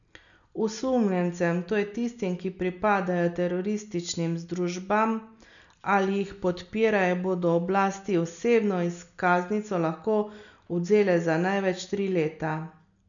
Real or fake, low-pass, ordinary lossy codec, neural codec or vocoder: real; 7.2 kHz; none; none